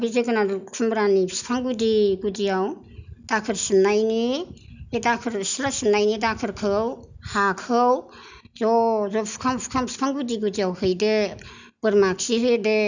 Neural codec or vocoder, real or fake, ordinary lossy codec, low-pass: none; real; none; 7.2 kHz